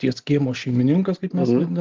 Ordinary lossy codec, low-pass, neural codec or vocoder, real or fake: Opus, 16 kbps; 7.2 kHz; none; real